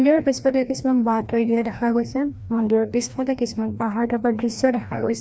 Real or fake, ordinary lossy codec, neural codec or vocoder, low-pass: fake; none; codec, 16 kHz, 1 kbps, FreqCodec, larger model; none